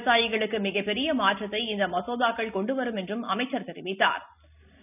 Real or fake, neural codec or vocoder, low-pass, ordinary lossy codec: real; none; 3.6 kHz; none